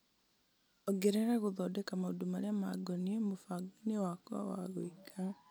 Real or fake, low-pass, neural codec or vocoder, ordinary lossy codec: real; none; none; none